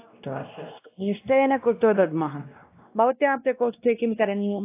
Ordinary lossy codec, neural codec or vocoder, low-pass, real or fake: AAC, 24 kbps; codec, 16 kHz, 1 kbps, X-Codec, WavLM features, trained on Multilingual LibriSpeech; 3.6 kHz; fake